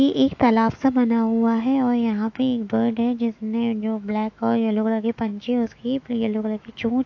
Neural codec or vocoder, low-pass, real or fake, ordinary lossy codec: autoencoder, 48 kHz, 128 numbers a frame, DAC-VAE, trained on Japanese speech; 7.2 kHz; fake; AAC, 48 kbps